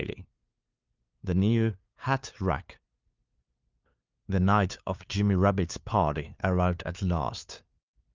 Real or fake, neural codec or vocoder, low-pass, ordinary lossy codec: fake; codec, 16 kHz, 2 kbps, FunCodec, trained on LibriTTS, 25 frames a second; 7.2 kHz; Opus, 24 kbps